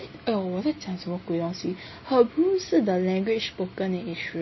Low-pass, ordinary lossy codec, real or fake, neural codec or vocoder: 7.2 kHz; MP3, 24 kbps; real; none